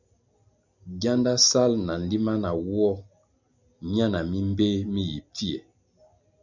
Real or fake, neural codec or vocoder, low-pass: real; none; 7.2 kHz